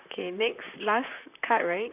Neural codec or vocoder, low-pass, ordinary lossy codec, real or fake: vocoder, 44.1 kHz, 128 mel bands, Pupu-Vocoder; 3.6 kHz; none; fake